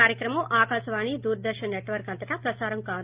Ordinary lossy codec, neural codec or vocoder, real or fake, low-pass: Opus, 32 kbps; none; real; 3.6 kHz